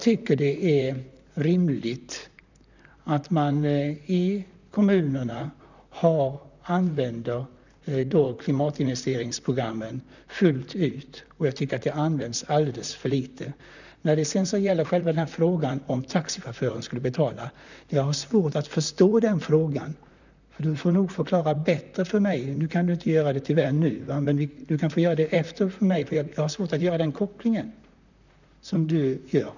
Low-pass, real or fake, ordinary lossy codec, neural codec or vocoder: 7.2 kHz; fake; none; vocoder, 44.1 kHz, 128 mel bands, Pupu-Vocoder